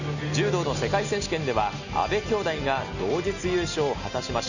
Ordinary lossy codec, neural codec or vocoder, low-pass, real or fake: none; none; 7.2 kHz; real